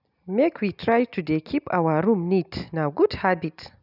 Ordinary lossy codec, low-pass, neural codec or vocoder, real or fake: none; 5.4 kHz; none; real